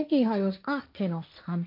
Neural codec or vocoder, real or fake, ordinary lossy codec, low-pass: codec, 16 kHz, 1.1 kbps, Voila-Tokenizer; fake; MP3, 48 kbps; 5.4 kHz